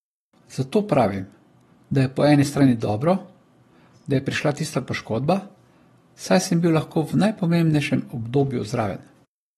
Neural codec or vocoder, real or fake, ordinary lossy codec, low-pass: none; real; AAC, 32 kbps; 19.8 kHz